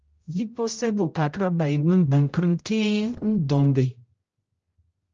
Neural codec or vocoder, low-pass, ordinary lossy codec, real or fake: codec, 16 kHz, 0.5 kbps, X-Codec, HuBERT features, trained on general audio; 7.2 kHz; Opus, 24 kbps; fake